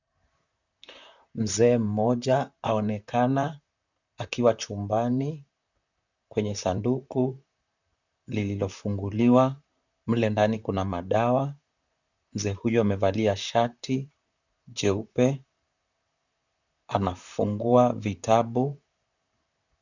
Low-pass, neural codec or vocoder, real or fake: 7.2 kHz; vocoder, 44.1 kHz, 128 mel bands, Pupu-Vocoder; fake